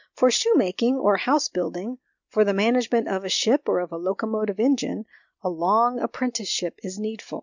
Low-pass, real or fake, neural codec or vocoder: 7.2 kHz; real; none